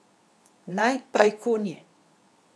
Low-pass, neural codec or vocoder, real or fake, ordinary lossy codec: none; codec, 24 kHz, 0.9 kbps, WavTokenizer, medium speech release version 2; fake; none